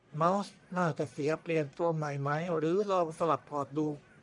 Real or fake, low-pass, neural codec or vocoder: fake; 10.8 kHz; codec, 44.1 kHz, 1.7 kbps, Pupu-Codec